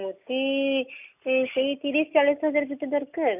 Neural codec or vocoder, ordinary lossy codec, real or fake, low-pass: none; none; real; 3.6 kHz